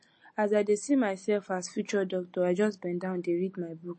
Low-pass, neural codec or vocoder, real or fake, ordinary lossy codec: 10.8 kHz; none; real; MP3, 32 kbps